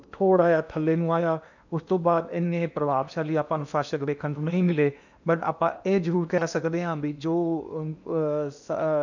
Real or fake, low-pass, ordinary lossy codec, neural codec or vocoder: fake; 7.2 kHz; none; codec, 16 kHz in and 24 kHz out, 0.8 kbps, FocalCodec, streaming, 65536 codes